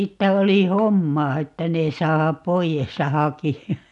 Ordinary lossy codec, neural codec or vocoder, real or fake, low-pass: none; none; real; 10.8 kHz